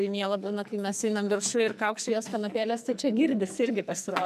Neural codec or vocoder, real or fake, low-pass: codec, 44.1 kHz, 2.6 kbps, SNAC; fake; 14.4 kHz